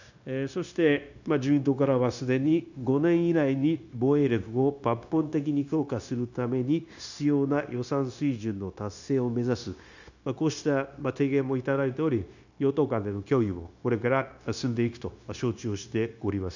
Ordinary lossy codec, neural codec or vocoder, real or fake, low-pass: none; codec, 16 kHz, 0.9 kbps, LongCat-Audio-Codec; fake; 7.2 kHz